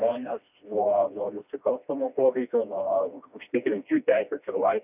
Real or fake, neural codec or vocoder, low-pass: fake; codec, 16 kHz, 1 kbps, FreqCodec, smaller model; 3.6 kHz